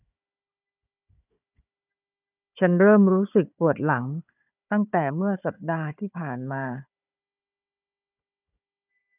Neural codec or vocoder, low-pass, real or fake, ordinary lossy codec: codec, 16 kHz, 4 kbps, FunCodec, trained on Chinese and English, 50 frames a second; 3.6 kHz; fake; none